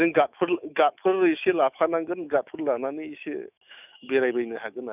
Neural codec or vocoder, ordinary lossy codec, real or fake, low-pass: autoencoder, 48 kHz, 128 numbers a frame, DAC-VAE, trained on Japanese speech; none; fake; 3.6 kHz